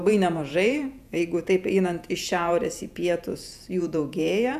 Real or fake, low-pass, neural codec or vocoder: fake; 14.4 kHz; vocoder, 48 kHz, 128 mel bands, Vocos